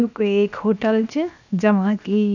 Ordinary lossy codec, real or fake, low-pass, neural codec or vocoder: none; fake; 7.2 kHz; codec, 16 kHz, 0.7 kbps, FocalCodec